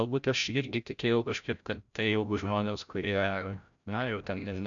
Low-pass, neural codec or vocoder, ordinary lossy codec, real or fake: 7.2 kHz; codec, 16 kHz, 0.5 kbps, FreqCodec, larger model; MP3, 96 kbps; fake